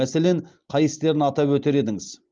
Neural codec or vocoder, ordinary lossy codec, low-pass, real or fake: none; Opus, 32 kbps; 7.2 kHz; real